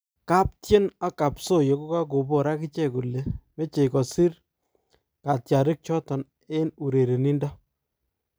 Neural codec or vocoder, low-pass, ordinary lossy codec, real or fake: none; none; none; real